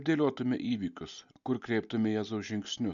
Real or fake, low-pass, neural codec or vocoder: real; 7.2 kHz; none